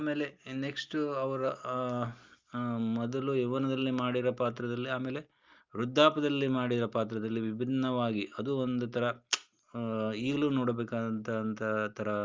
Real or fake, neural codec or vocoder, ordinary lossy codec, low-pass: real; none; Opus, 24 kbps; 7.2 kHz